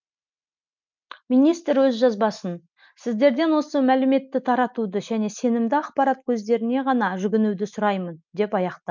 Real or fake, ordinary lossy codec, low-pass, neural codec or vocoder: real; MP3, 64 kbps; 7.2 kHz; none